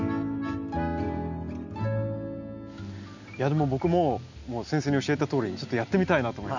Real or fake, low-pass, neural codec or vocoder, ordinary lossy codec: real; 7.2 kHz; none; none